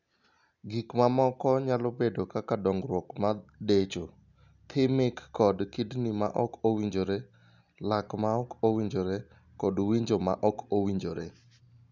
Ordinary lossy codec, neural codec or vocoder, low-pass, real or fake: none; none; 7.2 kHz; real